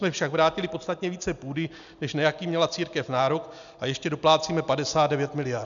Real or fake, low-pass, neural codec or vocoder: real; 7.2 kHz; none